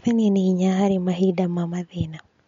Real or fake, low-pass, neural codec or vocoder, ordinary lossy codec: real; 7.2 kHz; none; MP3, 48 kbps